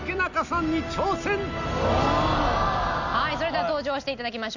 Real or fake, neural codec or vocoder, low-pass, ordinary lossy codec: real; none; 7.2 kHz; none